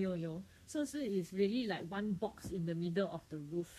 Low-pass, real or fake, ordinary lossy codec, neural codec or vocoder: 14.4 kHz; fake; MP3, 64 kbps; codec, 32 kHz, 1.9 kbps, SNAC